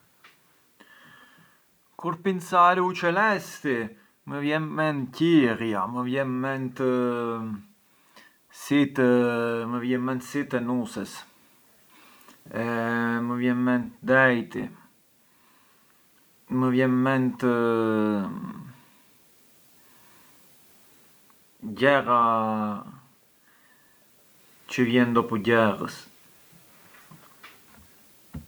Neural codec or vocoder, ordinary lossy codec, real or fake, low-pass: none; none; real; none